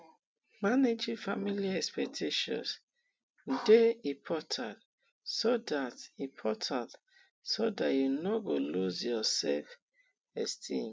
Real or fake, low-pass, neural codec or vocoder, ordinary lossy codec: real; none; none; none